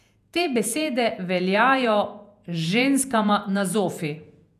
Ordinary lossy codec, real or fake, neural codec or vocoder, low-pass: none; fake; vocoder, 48 kHz, 128 mel bands, Vocos; 14.4 kHz